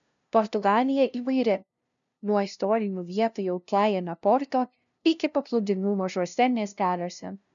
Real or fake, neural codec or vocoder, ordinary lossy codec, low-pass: fake; codec, 16 kHz, 0.5 kbps, FunCodec, trained on LibriTTS, 25 frames a second; MP3, 96 kbps; 7.2 kHz